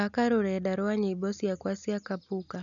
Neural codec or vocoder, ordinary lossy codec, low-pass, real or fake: none; none; 7.2 kHz; real